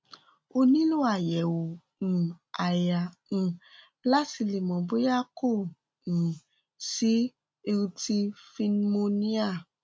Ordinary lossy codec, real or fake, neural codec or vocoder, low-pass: none; real; none; none